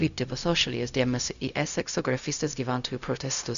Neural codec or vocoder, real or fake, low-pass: codec, 16 kHz, 0.4 kbps, LongCat-Audio-Codec; fake; 7.2 kHz